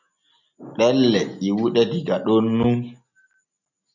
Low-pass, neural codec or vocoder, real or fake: 7.2 kHz; none; real